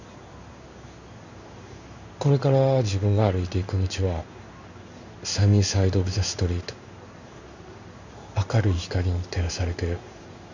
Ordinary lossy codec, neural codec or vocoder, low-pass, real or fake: none; codec, 16 kHz in and 24 kHz out, 1 kbps, XY-Tokenizer; 7.2 kHz; fake